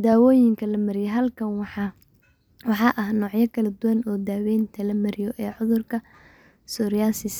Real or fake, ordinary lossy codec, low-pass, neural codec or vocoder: real; none; none; none